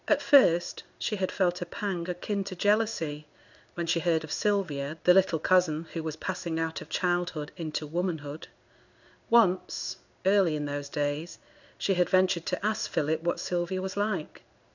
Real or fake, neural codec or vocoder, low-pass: fake; codec, 16 kHz in and 24 kHz out, 1 kbps, XY-Tokenizer; 7.2 kHz